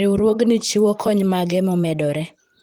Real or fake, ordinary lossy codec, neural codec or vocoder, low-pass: fake; Opus, 24 kbps; vocoder, 44.1 kHz, 128 mel bands, Pupu-Vocoder; 19.8 kHz